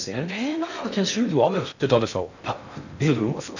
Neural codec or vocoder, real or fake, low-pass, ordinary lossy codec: codec, 16 kHz in and 24 kHz out, 0.6 kbps, FocalCodec, streaming, 2048 codes; fake; 7.2 kHz; none